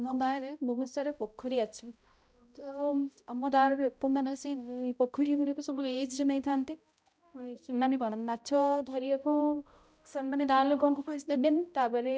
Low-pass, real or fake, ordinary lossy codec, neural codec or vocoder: none; fake; none; codec, 16 kHz, 0.5 kbps, X-Codec, HuBERT features, trained on balanced general audio